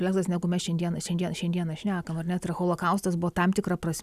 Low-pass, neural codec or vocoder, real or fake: 14.4 kHz; none; real